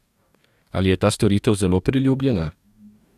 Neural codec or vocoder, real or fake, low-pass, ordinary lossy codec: codec, 44.1 kHz, 2.6 kbps, DAC; fake; 14.4 kHz; none